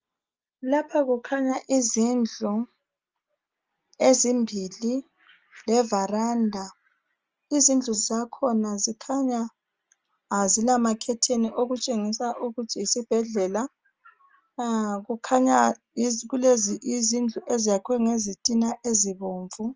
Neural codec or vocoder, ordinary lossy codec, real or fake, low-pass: none; Opus, 24 kbps; real; 7.2 kHz